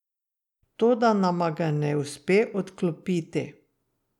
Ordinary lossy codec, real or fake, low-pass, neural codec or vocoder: none; real; 19.8 kHz; none